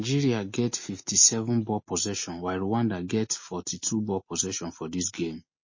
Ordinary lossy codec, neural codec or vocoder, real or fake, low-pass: MP3, 32 kbps; none; real; 7.2 kHz